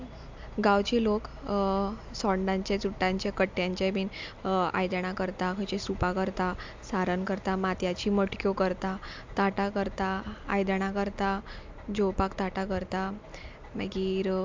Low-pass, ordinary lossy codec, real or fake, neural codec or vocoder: 7.2 kHz; MP3, 64 kbps; real; none